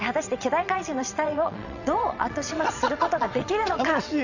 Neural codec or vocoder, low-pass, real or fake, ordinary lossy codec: vocoder, 22.05 kHz, 80 mel bands, WaveNeXt; 7.2 kHz; fake; none